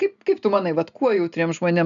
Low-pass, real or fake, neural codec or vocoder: 7.2 kHz; real; none